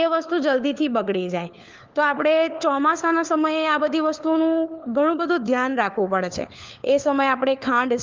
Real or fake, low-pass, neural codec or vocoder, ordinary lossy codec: fake; 7.2 kHz; codec, 16 kHz, 4 kbps, FunCodec, trained on LibriTTS, 50 frames a second; Opus, 24 kbps